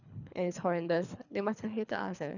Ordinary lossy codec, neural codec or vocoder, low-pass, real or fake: none; codec, 24 kHz, 3 kbps, HILCodec; 7.2 kHz; fake